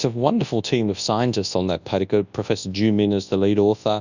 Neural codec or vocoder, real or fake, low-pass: codec, 24 kHz, 0.9 kbps, WavTokenizer, large speech release; fake; 7.2 kHz